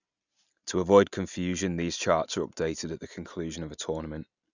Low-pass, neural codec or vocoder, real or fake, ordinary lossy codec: 7.2 kHz; none; real; none